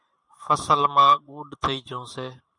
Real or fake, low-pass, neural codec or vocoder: fake; 10.8 kHz; vocoder, 44.1 kHz, 128 mel bands every 512 samples, BigVGAN v2